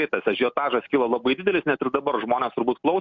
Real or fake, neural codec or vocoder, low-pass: real; none; 7.2 kHz